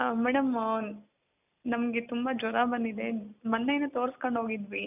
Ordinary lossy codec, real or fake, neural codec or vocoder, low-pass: none; real; none; 3.6 kHz